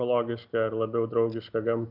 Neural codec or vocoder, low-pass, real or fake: none; 5.4 kHz; real